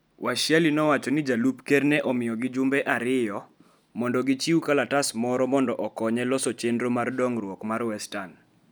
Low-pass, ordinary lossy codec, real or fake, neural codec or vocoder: none; none; real; none